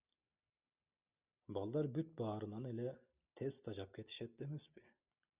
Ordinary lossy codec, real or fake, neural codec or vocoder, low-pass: Opus, 64 kbps; real; none; 3.6 kHz